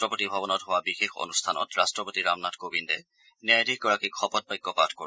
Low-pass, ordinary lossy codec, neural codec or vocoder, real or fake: none; none; none; real